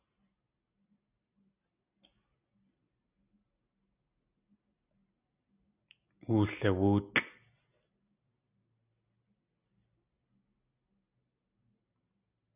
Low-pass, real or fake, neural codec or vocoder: 3.6 kHz; real; none